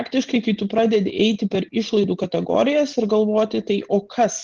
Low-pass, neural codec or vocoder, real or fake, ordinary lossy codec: 7.2 kHz; none; real; Opus, 16 kbps